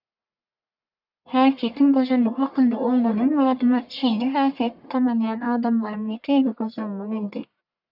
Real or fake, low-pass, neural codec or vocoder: fake; 5.4 kHz; codec, 44.1 kHz, 1.7 kbps, Pupu-Codec